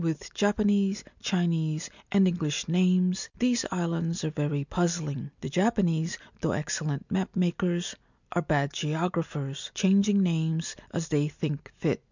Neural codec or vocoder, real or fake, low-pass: none; real; 7.2 kHz